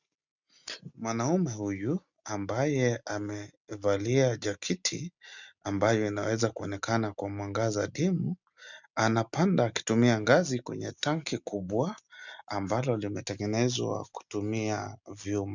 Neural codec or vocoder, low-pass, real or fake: none; 7.2 kHz; real